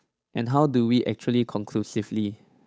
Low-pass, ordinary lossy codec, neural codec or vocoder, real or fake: none; none; codec, 16 kHz, 8 kbps, FunCodec, trained on Chinese and English, 25 frames a second; fake